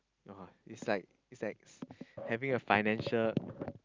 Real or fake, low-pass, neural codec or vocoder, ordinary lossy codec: real; 7.2 kHz; none; Opus, 24 kbps